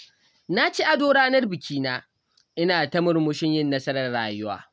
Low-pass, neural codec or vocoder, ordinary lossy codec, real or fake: none; none; none; real